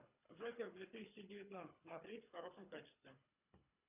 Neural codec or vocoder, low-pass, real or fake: codec, 24 kHz, 3 kbps, HILCodec; 3.6 kHz; fake